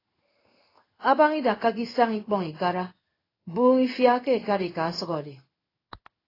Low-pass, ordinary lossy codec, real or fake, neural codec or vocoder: 5.4 kHz; AAC, 24 kbps; fake; codec, 16 kHz in and 24 kHz out, 1 kbps, XY-Tokenizer